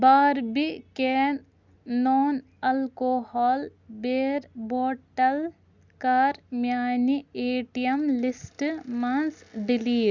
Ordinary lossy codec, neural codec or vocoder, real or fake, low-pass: Opus, 64 kbps; none; real; 7.2 kHz